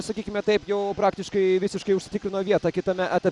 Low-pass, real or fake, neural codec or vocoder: 10.8 kHz; real; none